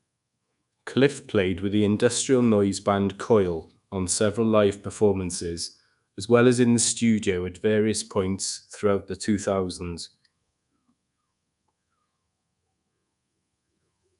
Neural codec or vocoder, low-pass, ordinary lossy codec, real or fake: codec, 24 kHz, 1.2 kbps, DualCodec; 10.8 kHz; none; fake